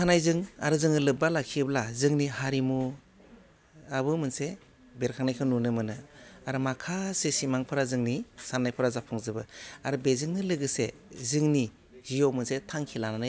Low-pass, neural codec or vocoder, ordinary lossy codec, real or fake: none; none; none; real